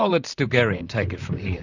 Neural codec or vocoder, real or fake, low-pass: vocoder, 44.1 kHz, 128 mel bands, Pupu-Vocoder; fake; 7.2 kHz